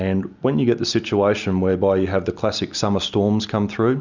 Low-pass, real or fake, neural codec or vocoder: 7.2 kHz; real; none